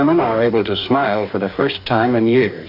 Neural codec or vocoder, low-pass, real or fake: codec, 44.1 kHz, 2.6 kbps, DAC; 5.4 kHz; fake